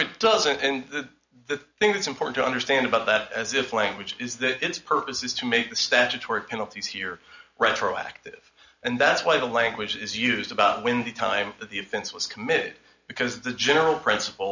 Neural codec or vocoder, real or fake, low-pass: none; real; 7.2 kHz